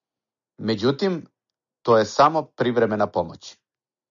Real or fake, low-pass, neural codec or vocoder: real; 7.2 kHz; none